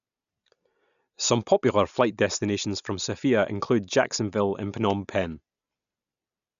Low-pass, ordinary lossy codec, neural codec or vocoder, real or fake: 7.2 kHz; none; none; real